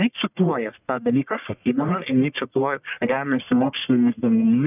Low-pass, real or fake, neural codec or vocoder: 3.6 kHz; fake; codec, 44.1 kHz, 1.7 kbps, Pupu-Codec